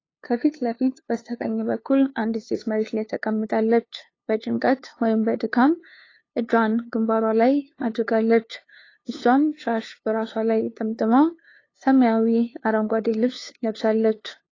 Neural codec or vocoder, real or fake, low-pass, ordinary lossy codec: codec, 16 kHz, 2 kbps, FunCodec, trained on LibriTTS, 25 frames a second; fake; 7.2 kHz; AAC, 32 kbps